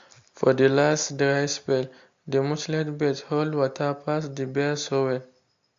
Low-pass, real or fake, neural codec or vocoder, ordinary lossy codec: 7.2 kHz; real; none; AAC, 48 kbps